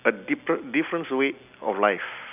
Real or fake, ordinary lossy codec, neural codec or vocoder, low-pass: real; none; none; 3.6 kHz